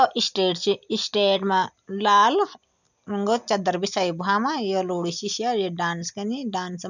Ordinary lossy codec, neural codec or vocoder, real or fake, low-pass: none; none; real; 7.2 kHz